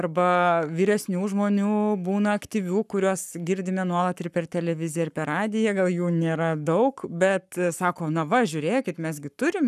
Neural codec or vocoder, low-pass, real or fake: codec, 44.1 kHz, 7.8 kbps, Pupu-Codec; 14.4 kHz; fake